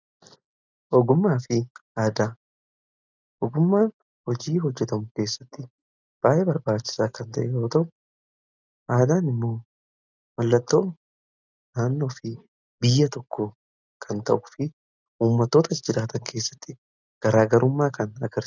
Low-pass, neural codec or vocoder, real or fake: 7.2 kHz; none; real